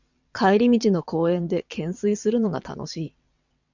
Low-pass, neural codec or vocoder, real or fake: 7.2 kHz; vocoder, 22.05 kHz, 80 mel bands, WaveNeXt; fake